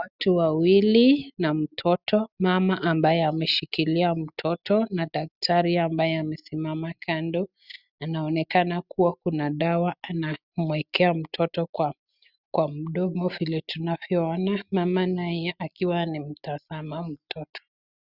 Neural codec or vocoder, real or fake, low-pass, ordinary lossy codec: none; real; 5.4 kHz; Opus, 64 kbps